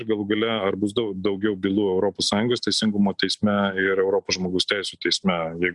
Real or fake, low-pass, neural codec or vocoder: real; 10.8 kHz; none